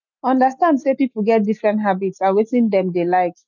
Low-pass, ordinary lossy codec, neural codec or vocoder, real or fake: 7.2 kHz; none; none; real